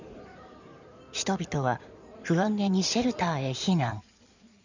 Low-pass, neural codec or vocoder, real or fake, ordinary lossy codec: 7.2 kHz; codec, 16 kHz in and 24 kHz out, 2.2 kbps, FireRedTTS-2 codec; fake; none